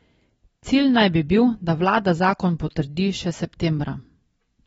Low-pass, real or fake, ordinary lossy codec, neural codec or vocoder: 14.4 kHz; real; AAC, 24 kbps; none